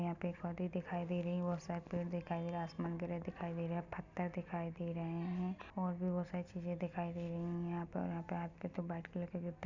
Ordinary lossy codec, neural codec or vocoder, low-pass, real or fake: none; none; 7.2 kHz; real